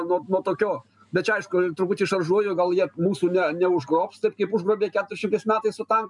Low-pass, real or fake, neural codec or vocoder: 10.8 kHz; real; none